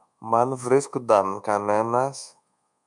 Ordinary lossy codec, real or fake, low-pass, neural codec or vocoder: MP3, 96 kbps; fake; 10.8 kHz; codec, 24 kHz, 1.2 kbps, DualCodec